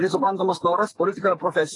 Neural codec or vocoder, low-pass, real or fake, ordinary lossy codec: codec, 44.1 kHz, 2.6 kbps, SNAC; 10.8 kHz; fake; AAC, 32 kbps